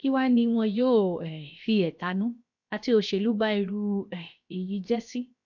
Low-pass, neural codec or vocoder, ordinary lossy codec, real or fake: 7.2 kHz; codec, 16 kHz, 0.7 kbps, FocalCodec; none; fake